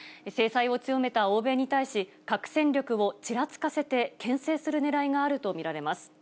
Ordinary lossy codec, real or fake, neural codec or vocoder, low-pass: none; real; none; none